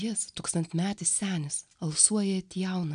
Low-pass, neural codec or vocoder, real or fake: 9.9 kHz; none; real